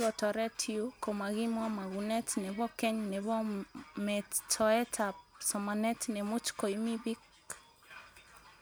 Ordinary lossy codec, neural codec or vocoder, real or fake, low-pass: none; none; real; none